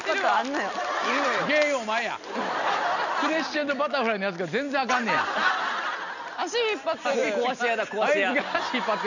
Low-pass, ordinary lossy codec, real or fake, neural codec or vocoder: 7.2 kHz; none; real; none